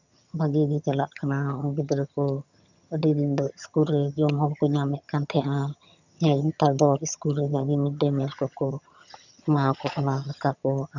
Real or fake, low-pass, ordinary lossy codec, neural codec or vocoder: fake; 7.2 kHz; none; vocoder, 22.05 kHz, 80 mel bands, HiFi-GAN